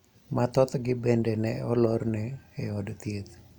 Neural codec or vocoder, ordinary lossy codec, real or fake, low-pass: none; none; real; 19.8 kHz